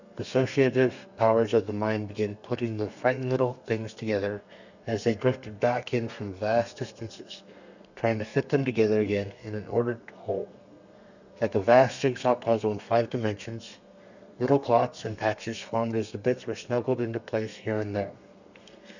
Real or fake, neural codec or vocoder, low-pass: fake; codec, 32 kHz, 1.9 kbps, SNAC; 7.2 kHz